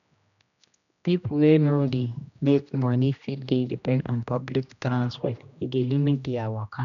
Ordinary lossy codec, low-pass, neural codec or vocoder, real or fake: none; 7.2 kHz; codec, 16 kHz, 1 kbps, X-Codec, HuBERT features, trained on general audio; fake